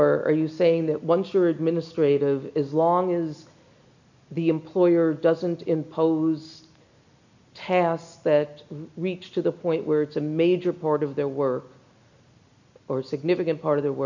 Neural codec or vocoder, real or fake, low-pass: none; real; 7.2 kHz